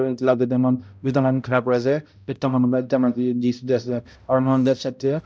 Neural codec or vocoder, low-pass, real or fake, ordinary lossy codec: codec, 16 kHz, 0.5 kbps, X-Codec, HuBERT features, trained on balanced general audio; none; fake; none